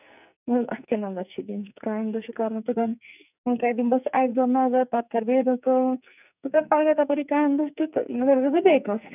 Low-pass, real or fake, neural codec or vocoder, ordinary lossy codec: 3.6 kHz; fake; codec, 44.1 kHz, 2.6 kbps, SNAC; none